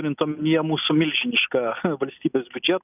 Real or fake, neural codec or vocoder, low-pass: real; none; 3.6 kHz